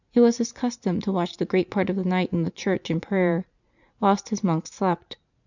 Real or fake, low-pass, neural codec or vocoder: fake; 7.2 kHz; vocoder, 44.1 kHz, 80 mel bands, Vocos